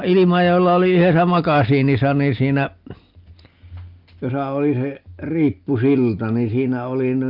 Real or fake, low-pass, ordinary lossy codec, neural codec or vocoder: real; 5.4 kHz; Opus, 24 kbps; none